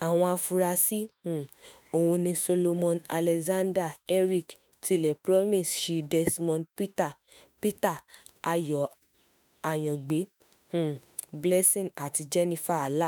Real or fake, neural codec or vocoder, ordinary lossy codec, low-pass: fake; autoencoder, 48 kHz, 32 numbers a frame, DAC-VAE, trained on Japanese speech; none; none